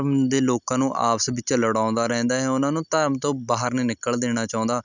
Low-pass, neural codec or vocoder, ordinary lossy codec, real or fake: 7.2 kHz; none; none; real